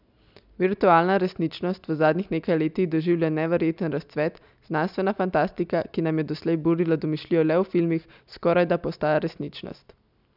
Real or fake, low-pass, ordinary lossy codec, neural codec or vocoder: real; 5.4 kHz; none; none